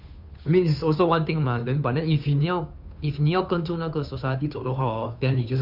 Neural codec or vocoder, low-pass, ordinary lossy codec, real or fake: codec, 16 kHz, 2 kbps, FunCodec, trained on Chinese and English, 25 frames a second; 5.4 kHz; none; fake